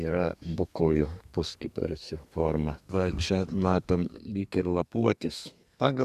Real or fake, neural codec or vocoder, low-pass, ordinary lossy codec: fake; codec, 32 kHz, 1.9 kbps, SNAC; 14.4 kHz; Opus, 64 kbps